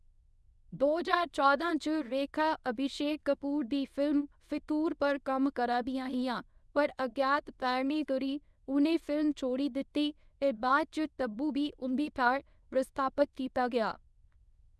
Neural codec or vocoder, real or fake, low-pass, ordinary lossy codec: codec, 24 kHz, 0.9 kbps, WavTokenizer, medium speech release version 1; fake; none; none